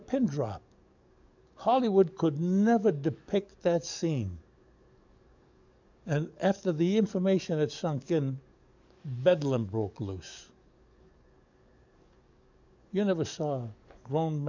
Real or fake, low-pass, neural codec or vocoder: fake; 7.2 kHz; codec, 44.1 kHz, 7.8 kbps, DAC